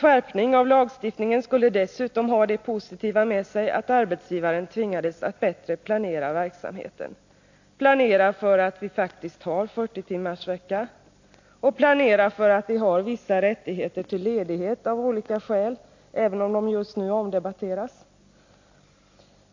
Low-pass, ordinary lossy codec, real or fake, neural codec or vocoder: 7.2 kHz; AAC, 48 kbps; real; none